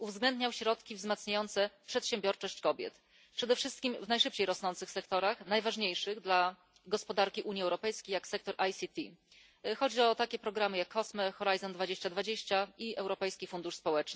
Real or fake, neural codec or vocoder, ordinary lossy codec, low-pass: real; none; none; none